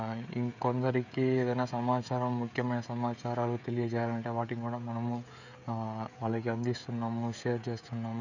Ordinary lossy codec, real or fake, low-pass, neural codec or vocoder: none; fake; 7.2 kHz; codec, 16 kHz, 16 kbps, FreqCodec, smaller model